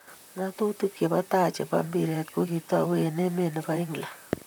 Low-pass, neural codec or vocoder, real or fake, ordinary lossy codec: none; vocoder, 44.1 kHz, 128 mel bands, Pupu-Vocoder; fake; none